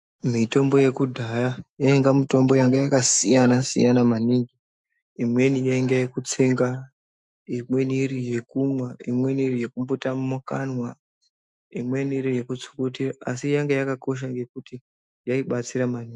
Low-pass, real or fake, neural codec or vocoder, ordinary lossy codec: 10.8 kHz; fake; autoencoder, 48 kHz, 128 numbers a frame, DAC-VAE, trained on Japanese speech; MP3, 96 kbps